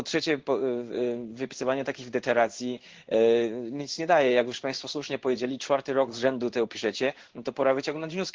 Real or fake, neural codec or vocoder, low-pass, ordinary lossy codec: fake; codec, 16 kHz in and 24 kHz out, 1 kbps, XY-Tokenizer; 7.2 kHz; Opus, 16 kbps